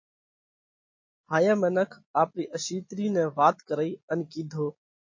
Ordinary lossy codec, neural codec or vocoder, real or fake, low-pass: MP3, 32 kbps; none; real; 7.2 kHz